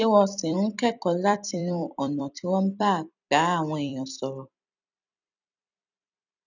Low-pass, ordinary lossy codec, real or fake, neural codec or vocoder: 7.2 kHz; none; fake; vocoder, 44.1 kHz, 128 mel bands every 256 samples, BigVGAN v2